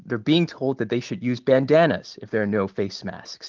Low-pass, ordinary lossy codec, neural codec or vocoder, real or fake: 7.2 kHz; Opus, 24 kbps; none; real